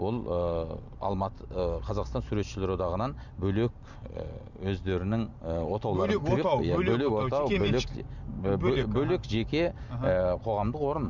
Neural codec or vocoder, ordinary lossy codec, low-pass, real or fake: none; none; 7.2 kHz; real